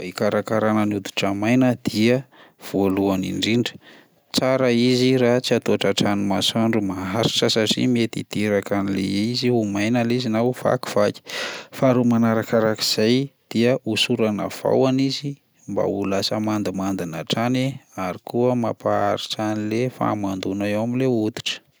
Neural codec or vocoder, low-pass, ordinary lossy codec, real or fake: none; none; none; real